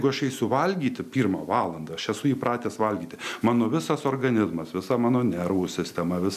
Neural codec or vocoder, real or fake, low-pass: none; real; 14.4 kHz